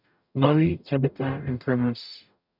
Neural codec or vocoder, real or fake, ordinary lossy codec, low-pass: codec, 44.1 kHz, 0.9 kbps, DAC; fake; none; 5.4 kHz